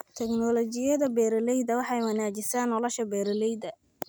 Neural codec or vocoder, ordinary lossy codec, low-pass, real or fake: none; none; none; real